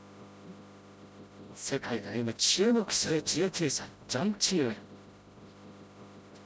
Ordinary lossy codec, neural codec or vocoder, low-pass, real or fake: none; codec, 16 kHz, 0.5 kbps, FreqCodec, smaller model; none; fake